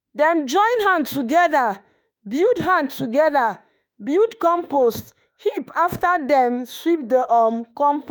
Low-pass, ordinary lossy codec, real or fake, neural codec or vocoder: none; none; fake; autoencoder, 48 kHz, 32 numbers a frame, DAC-VAE, trained on Japanese speech